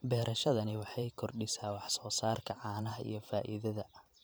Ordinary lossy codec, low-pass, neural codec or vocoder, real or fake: none; none; vocoder, 44.1 kHz, 128 mel bands every 512 samples, BigVGAN v2; fake